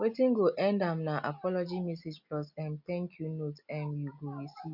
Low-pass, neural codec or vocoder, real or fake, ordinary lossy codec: 5.4 kHz; none; real; none